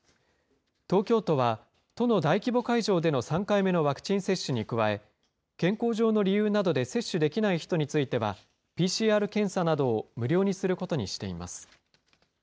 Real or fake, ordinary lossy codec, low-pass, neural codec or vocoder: real; none; none; none